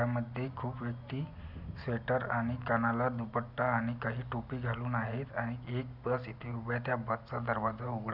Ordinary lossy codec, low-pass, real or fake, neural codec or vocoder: none; 5.4 kHz; real; none